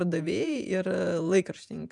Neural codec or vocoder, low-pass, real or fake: vocoder, 48 kHz, 128 mel bands, Vocos; 10.8 kHz; fake